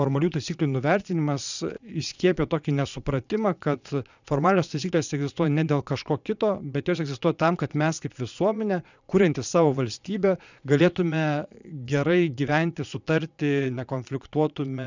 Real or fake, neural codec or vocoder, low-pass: fake; vocoder, 22.05 kHz, 80 mel bands, Vocos; 7.2 kHz